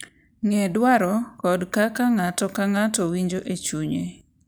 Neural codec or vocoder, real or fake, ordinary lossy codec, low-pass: none; real; none; none